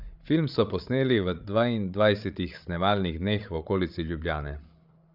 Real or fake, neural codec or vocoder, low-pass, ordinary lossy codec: fake; codec, 16 kHz, 8 kbps, FreqCodec, larger model; 5.4 kHz; none